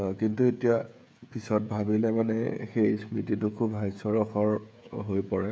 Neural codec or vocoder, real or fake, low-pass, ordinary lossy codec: codec, 16 kHz, 16 kbps, FreqCodec, smaller model; fake; none; none